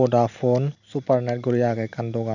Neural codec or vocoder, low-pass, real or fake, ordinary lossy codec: none; 7.2 kHz; real; none